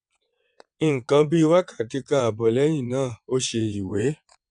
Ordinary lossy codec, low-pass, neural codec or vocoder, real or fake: none; 9.9 kHz; vocoder, 22.05 kHz, 80 mel bands, WaveNeXt; fake